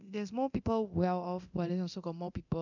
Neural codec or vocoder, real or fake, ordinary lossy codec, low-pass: codec, 24 kHz, 0.9 kbps, DualCodec; fake; none; 7.2 kHz